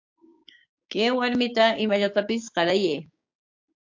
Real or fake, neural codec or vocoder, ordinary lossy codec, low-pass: fake; codec, 16 kHz, 6 kbps, DAC; AAC, 48 kbps; 7.2 kHz